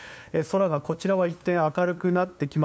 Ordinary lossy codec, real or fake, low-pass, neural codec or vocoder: none; fake; none; codec, 16 kHz, 2 kbps, FunCodec, trained on LibriTTS, 25 frames a second